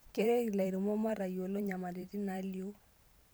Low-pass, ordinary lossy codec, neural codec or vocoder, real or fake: none; none; vocoder, 44.1 kHz, 128 mel bands every 512 samples, BigVGAN v2; fake